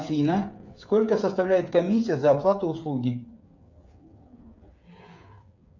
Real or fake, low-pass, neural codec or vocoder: fake; 7.2 kHz; codec, 16 kHz, 8 kbps, FreqCodec, smaller model